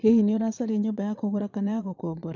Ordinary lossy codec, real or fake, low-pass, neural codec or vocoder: none; fake; 7.2 kHz; vocoder, 22.05 kHz, 80 mel bands, Vocos